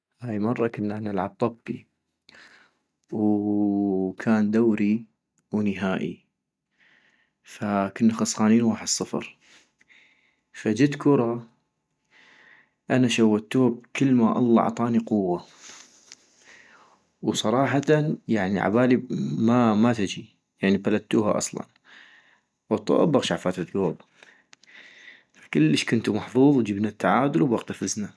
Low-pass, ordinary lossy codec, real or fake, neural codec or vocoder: none; none; real; none